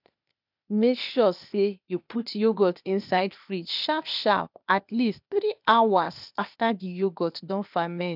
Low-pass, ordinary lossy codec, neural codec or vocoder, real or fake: 5.4 kHz; none; codec, 16 kHz, 0.8 kbps, ZipCodec; fake